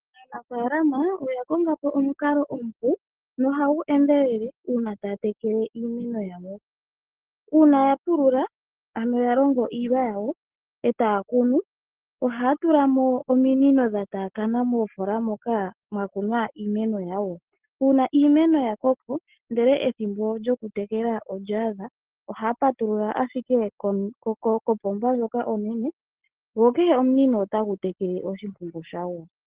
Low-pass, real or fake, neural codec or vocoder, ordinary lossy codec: 3.6 kHz; real; none; Opus, 16 kbps